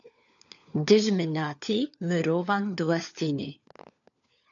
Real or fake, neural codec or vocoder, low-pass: fake; codec, 16 kHz, 4 kbps, FunCodec, trained on LibriTTS, 50 frames a second; 7.2 kHz